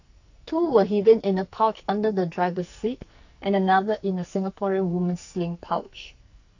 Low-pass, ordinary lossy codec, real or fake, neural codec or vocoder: 7.2 kHz; AAC, 48 kbps; fake; codec, 44.1 kHz, 2.6 kbps, SNAC